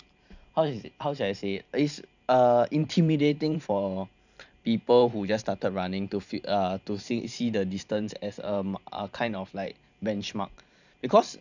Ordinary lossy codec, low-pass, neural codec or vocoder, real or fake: none; 7.2 kHz; vocoder, 44.1 kHz, 128 mel bands every 512 samples, BigVGAN v2; fake